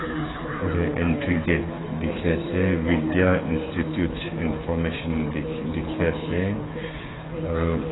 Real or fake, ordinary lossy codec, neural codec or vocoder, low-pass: fake; AAC, 16 kbps; codec, 16 kHz, 8 kbps, FreqCodec, smaller model; 7.2 kHz